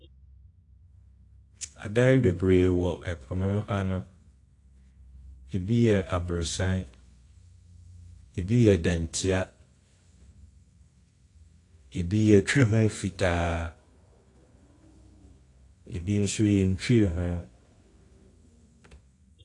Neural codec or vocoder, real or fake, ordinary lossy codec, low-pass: codec, 24 kHz, 0.9 kbps, WavTokenizer, medium music audio release; fake; AAC, 48 kbps; 10.8 kHz